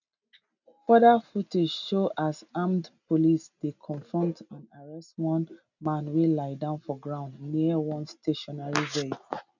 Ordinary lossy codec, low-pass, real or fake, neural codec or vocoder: none; 7.2 kHz; real; none